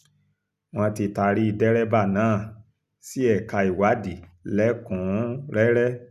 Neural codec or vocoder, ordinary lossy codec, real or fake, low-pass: none; none; real; 14.4 kHz